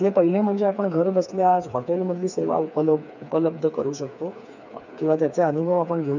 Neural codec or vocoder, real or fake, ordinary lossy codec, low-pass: codec, 16 kHz, 4 kbps, FreqCodec, smaller model; fake; none; 7.2 kHz